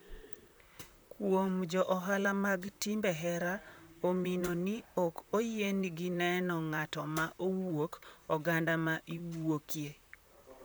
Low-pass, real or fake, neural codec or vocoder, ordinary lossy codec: none; fake; vocoder, 44.1 kHz, 128 mel bands, Pupu-Vocoder; none